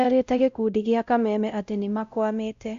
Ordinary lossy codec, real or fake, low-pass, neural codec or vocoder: Opus, 64 kbps; fake; 7.2 kHz; codec, 16 kHz, 0.5 kbps, X-Codec, WavLM features, trained on Multilingual LibriSpeech